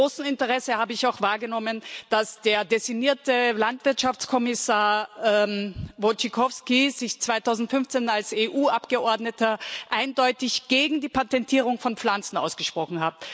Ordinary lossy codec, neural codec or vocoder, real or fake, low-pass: none; none; real; none